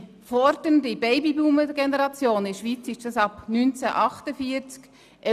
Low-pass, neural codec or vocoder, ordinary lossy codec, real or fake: 14.4 kHz; none; none; real